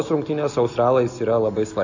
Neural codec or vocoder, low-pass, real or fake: none; 7.2 kHz; real